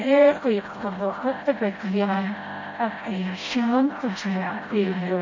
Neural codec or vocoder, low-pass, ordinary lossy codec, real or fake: codec, 16 kHz, 0.5 kbps, FreqCodec, smaller model; 7.2 kHz; MP3, 32 kbps; fake